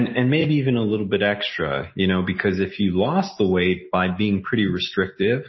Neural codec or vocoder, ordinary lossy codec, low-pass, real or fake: none; MP3, 24 kbps; 7.2 kHz; real